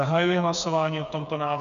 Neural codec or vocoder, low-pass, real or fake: codec, 16 kHz, 4 kbps, FreqCodec, smaller model; 7.2 kHz; fake